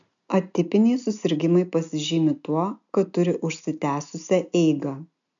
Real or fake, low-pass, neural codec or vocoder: real; 7.2 kHz; none